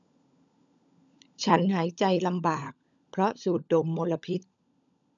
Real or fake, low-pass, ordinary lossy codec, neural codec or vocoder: fake; 7.2 kHz; none; codec, 16 kHz, 16 kbps, FunCodec, trained on LibriTTS, 50 frames a second